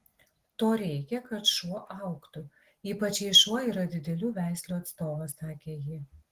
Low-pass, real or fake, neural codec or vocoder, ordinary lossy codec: 14.4 kHz; real; none; Opus, 16 kbps